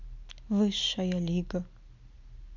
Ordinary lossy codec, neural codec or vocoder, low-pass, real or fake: MP3, 64 kbps; none; 7.2 kHz; real